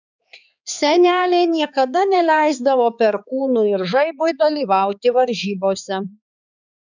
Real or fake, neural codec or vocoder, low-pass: fake; codec, 16 kHz, 4 kbps, X-Codec, HuBERT features, trained on balanced general audio; 7.2 kHz